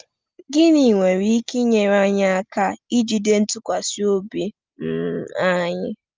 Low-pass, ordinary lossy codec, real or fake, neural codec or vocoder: 7.2 kHz; Opus, 24 kbps; real; none